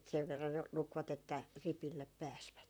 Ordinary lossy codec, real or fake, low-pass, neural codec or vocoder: none; fake; none; vocoder, 44.1 kHz, 128 mel bands, Pupu-Vocoder